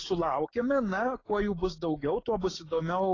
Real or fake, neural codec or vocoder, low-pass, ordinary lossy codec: fake; codec, 16 kHz, 6 kbps, DAC; 7.2 kHz; AAC, 32 kbps